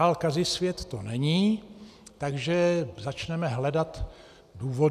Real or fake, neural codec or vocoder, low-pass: real; none; 14.4 kHz